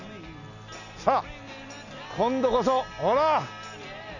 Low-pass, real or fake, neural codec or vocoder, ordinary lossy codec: 7.2 kHz; real; none; none